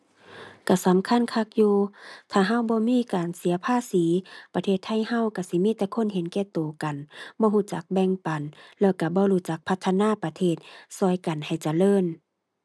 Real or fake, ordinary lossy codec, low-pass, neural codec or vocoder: real; none; none; none